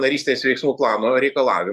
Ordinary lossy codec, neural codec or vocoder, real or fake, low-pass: Opus, 64 kbps; vocoder, 44.1 kHz, 128 mel bands every 512 samples, BigVGAN v2; fake; 14.4 kHz